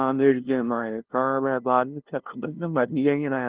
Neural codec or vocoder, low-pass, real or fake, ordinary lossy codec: codec, 16 kHz, 0.5 kbps, FunCodec, trained on LibriTTS, 25 frames a second; 3.6 kHz; fake; Opus, 16 kbps